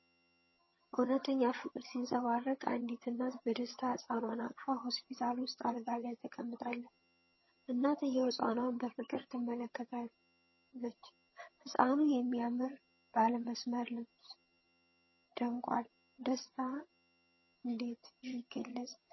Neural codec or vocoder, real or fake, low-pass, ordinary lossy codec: vocoder, 22.05 kHz, 80 mel bands, HiFi-GAN; fake; 7.2 kHz; MP3, 24 kbps